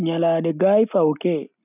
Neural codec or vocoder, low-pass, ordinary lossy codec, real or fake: none; 3.6 kHz; none; real